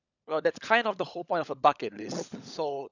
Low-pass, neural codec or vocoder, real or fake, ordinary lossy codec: 7.2 kHz; codec, 16 kHz, 16 kbps, FunCodec, trained on LibriTTS, 50 frames a second; fake; none